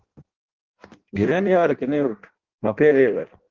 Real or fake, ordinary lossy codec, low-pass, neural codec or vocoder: fake; Opus, 16 kbps; 7.2 kHz; codec, 16 kHz in and 24 kHz out, 0.6 kbps, FireRedTTS-2 codec